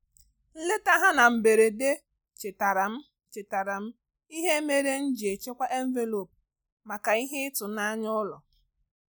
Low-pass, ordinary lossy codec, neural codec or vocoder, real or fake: none; none; none; real